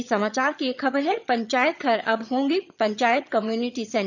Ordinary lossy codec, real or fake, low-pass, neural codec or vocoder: none; fake; 7.2 kHz; vocoder, 22.05 kHz, 80 mel bands, HiFi-GAN